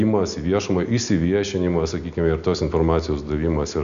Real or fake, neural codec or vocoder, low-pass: real; none; 7.2 kHz